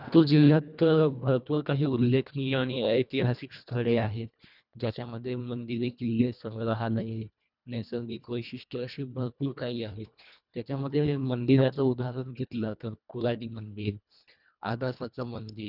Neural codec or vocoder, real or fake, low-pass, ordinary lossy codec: codec, 24 kHz, 1.5 kbps, HILCodec; fake; 5.4 kHz; none